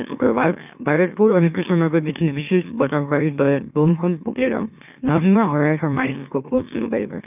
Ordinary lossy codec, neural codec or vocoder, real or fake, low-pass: none; autoencoder, 44.1 kHz, a latent of 192 numbers a frame, MeloTTS; fake; 3.6 kHz